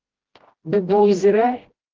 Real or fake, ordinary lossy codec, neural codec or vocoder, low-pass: fake; Opus, 16 kbps; codec, 16 kHz, 1 kbps, FreqCodec, smaller model; 7.2 kHz